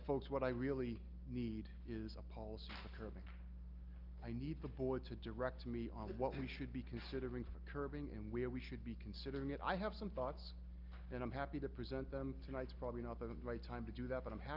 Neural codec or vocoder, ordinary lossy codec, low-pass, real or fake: none; Opus, 32 kbps; 5.4 kHz; real